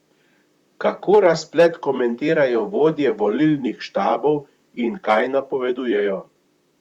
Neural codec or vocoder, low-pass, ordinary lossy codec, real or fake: vocoder, 44.1 kHz, 128 mel bands, Pupu-Vocoder; 19.8 kHz; Opus, 64 kbps; fake